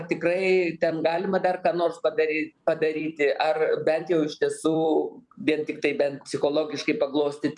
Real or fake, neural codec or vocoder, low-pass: fake; vocoder, 44.1 kHz, 128 mel bands every 256 samples, BigVGAN v2; 10.8 kHz